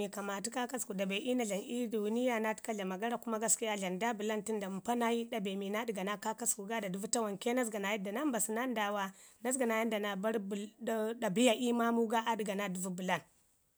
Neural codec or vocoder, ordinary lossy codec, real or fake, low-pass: none; none; real; none